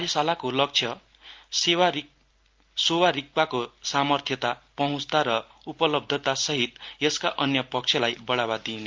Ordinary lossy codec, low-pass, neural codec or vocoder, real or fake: Opus, 32 kbps; 7.2 kHz; none; real